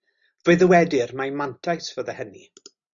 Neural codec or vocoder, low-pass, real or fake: none; 7.2 kHz; real